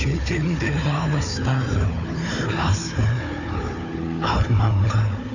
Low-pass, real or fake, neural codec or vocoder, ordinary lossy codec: 7.2 kHz; fake; codec, 16 kHz, 4 kbps, FunCodec, trained on Chinese and English, 50 frames a second; none